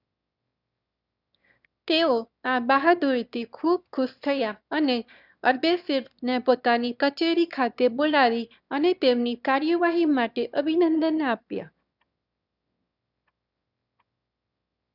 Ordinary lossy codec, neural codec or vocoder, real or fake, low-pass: none; autoencoder, 22.05 kHz, a latent of 192 numbers a frame, VITS, trained on one speaker; fake; 5.4 kHz